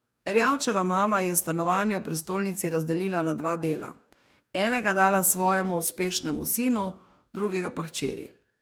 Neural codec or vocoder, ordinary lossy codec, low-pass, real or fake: codec, 44.1 kHz, 2.6 kbps, DAC; none; none; fake